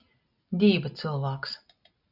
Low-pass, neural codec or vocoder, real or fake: 5.4 kHz; none; real